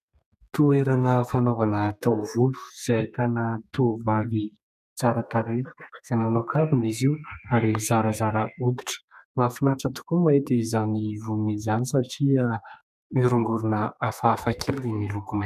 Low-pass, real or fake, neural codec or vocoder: 14.4 kHz; fake; codec, 32 kHz, 1.9 kbps, SNAC